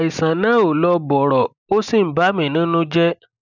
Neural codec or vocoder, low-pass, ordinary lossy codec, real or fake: none; 7.2 kHz; none; real